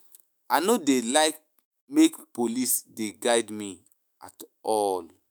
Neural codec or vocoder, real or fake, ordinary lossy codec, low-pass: autoencoder, 48 kHz, 128 numbers a frame, DAC-VAE, trained on Japanese speech; fake; none; none